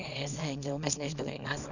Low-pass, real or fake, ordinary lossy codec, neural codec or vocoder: 7.2 kHz; fake; none; codec, 24 kHz, 0.9 kbps, WavTokenizer, small release